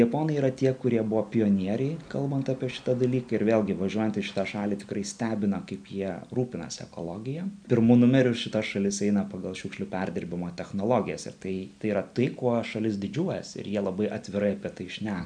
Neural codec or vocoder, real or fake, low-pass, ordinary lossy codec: none; real; 9.9 kHz; MP3, 96 kbps